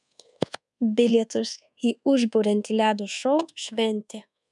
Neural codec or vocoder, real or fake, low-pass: codec, 24 kHz, 1.2 kbps, DualCodec; fake; 10.8 kHz